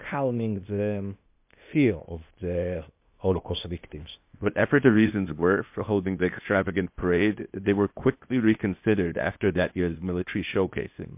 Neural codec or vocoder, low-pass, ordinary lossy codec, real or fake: codec, 16 kHz, 0.8 kbps, ZipCodec; 3.6 kHz; MP3, 32 kbps; fake